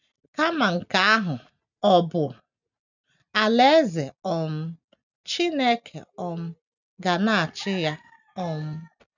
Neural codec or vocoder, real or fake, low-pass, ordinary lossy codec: none; real; 7.2 kHz; none